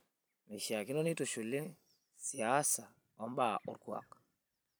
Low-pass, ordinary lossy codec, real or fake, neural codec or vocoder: none; none; fake; vocoder, 44.1 kHz, 128 mel bands every 256 samples, BigVGAN v2